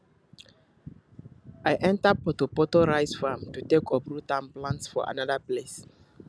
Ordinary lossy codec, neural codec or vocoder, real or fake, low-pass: none; none; real; none